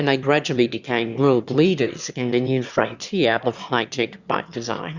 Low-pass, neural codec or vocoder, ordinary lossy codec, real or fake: 7.2 kHz; autoencoder, 22.05 kHz, a latent of 192 numbers a frame, VITS, trained on one speaker; Opus, 64 kbps; fake